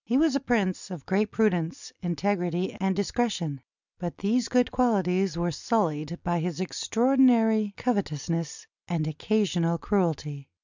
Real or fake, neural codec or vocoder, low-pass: real; none; 7.2 kHz